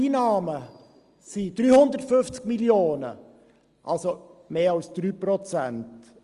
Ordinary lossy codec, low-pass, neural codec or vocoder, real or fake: Opus, 32 kbps; 10.8 kHz; none; real